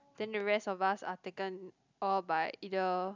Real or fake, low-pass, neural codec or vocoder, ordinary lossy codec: real; 7.2 kHz; none; none